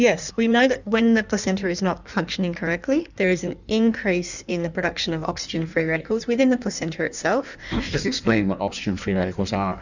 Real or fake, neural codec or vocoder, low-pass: fake; codec, 16 kHz in and 24 kHz out, 1.1 kbps, FireRedTTS-2 codec; 7.2 kHz